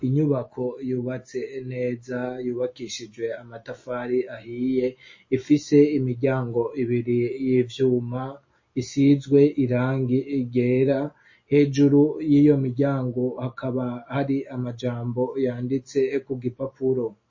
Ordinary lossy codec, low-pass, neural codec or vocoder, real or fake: MP3, 32 kbps; 7.2 kHz; none; real